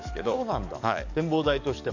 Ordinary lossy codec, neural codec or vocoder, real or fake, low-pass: none; codec, 44.1 kHz, 7.8 kbps, DAC; fake; 7.2 kHz